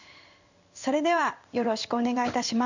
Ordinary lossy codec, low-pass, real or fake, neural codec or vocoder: none; 7.2 kHz; fake; codec, 16 kHz in and 24 kHz out, 1 kbps, XY-Tokenizer